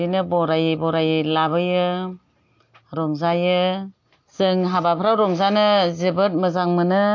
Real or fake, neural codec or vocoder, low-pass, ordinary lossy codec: real; none; 7.2 kHz; AAC, 48 kbps